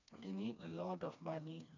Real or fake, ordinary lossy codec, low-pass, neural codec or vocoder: fake; none; 7.2 kHz; codec, 16 kHz, 2 kbps, FreqCodec, smaller model